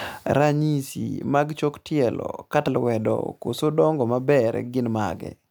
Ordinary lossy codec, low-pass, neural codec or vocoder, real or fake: none; none; none; real